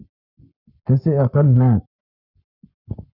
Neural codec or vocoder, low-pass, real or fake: codec, 44.1 kHz, 7.8 kbps, Pupu-Codec; 5.4 kHz; fake